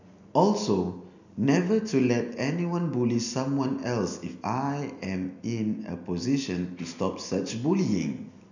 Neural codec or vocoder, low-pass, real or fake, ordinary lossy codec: none; 7.2 kHz; real; none